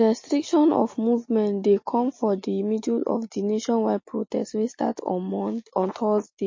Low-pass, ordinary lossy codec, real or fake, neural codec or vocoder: 7.2 kHz; MP3, 32 kbps; real; none